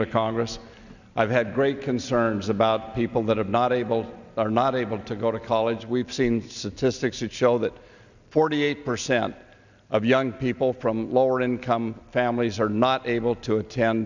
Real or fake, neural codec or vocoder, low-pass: real; none; 7.2 kHz